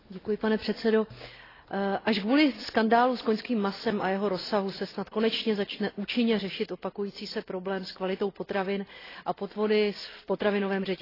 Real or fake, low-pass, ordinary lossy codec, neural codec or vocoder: real; 5.4 kHz; AAC, 24 kbps; none